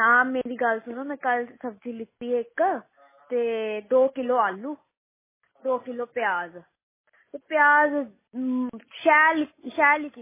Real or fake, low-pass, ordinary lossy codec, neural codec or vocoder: real; 3.6 kHz; MP3, 16 kbps; none